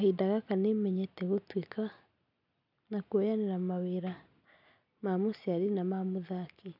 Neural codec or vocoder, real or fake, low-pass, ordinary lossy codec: none; real; 5.4 kHz; none